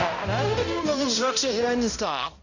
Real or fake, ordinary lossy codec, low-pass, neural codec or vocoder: fake; none; 7.2 kHz; codec, 16 kHz, 0.5 kbps, X-Codec, HuBERT features, trained on balanced general audio